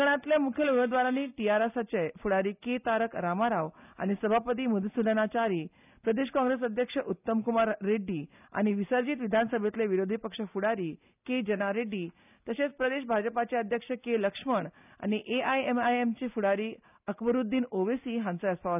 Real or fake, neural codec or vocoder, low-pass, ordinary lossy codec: real; none; 3.6 kHz; none